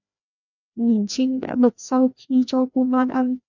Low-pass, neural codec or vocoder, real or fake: 7.2 kHz; codec, 16 kHz, 1 kbps, FreqCodec, larger model; fake